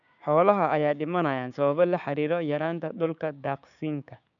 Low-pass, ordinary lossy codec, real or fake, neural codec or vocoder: 7.2 kHz; none; fake; codec, 16 kHz, 6 kbps, DAC